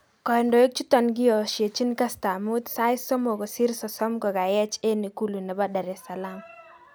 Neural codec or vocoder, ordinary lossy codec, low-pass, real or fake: none; none; none; real